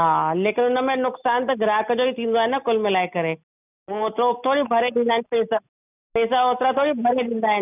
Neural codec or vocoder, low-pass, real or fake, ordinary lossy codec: none; 3.6 kHz; real; none